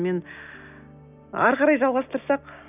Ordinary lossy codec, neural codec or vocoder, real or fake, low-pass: none; none; real; 3.6 kHz